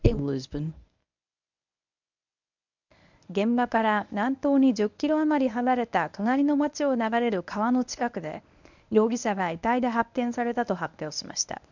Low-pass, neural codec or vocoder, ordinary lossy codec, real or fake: 7.2 kHz; codec, 24 kHz, 0.9 kbps, WavTokenizer, medium speech release version 1; none; fake